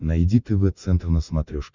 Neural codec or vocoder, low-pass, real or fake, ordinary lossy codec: none; 7.2 kHz; real; Opus, 64 kbps